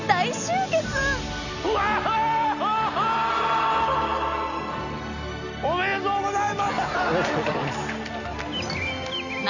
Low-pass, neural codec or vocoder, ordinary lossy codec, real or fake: 7.2 kHz; none; none; real